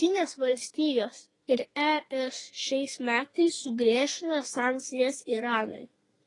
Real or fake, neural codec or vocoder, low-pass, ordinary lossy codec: fake; codec, 32 kHz, 1.9 kbps, SNAC; 10.8 kHz; AAC, 32 kbps